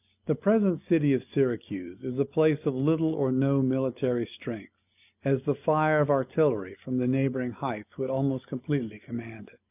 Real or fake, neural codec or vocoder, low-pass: real; none; 3.6 kHz